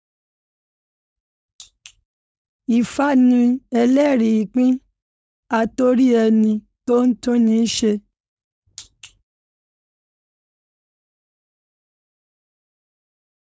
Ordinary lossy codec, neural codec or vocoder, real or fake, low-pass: none; codec, 16 kHz, 4.8 kbps, FACodec; fake; none